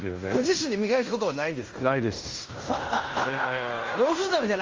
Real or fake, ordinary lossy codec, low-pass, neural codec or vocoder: fake; Opus, 32 kbps; 7.2 kHz; codec, 16 kHz in and 24 kHz out, 0.9 kbps, LongCat-Audio-Codec, fine tuned four codebook decoder